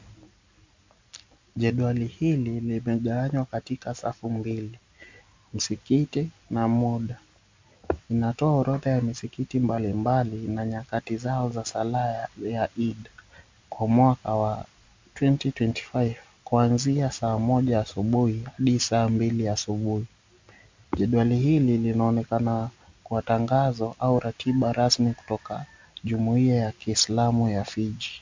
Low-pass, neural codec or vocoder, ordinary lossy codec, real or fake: 7.2 kHz; none; MP3, 64 kbps; real